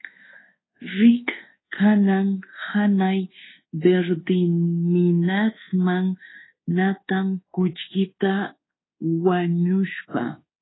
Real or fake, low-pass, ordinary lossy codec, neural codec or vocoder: fake; 7.2 kHz; AAC, 16 kbps; codec, 24 kHz, 1.2 kbps, DualCodec